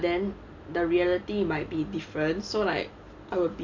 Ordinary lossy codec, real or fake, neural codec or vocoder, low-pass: none; real; none; 7.2 kHz